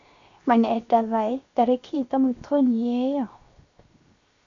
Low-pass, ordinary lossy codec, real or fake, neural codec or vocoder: 7.2 kHz; Opus, 64 kbps; fake; codec, 16 kHz, 0.7 kbps, FocalCodec